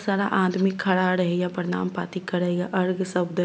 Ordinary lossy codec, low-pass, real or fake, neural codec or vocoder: none; none; real; none